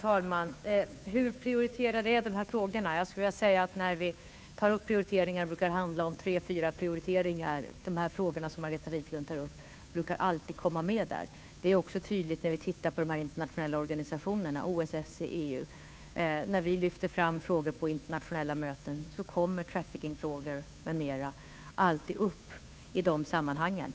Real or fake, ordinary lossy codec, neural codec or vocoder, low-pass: fake; none; codec, 16 kHz, 2 kbps, FunCodec, trained on Chinese and English, 25 frames a second; none